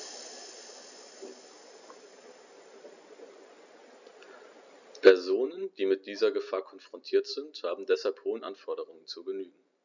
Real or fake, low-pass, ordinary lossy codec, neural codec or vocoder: real; 7.2 kHz; none; none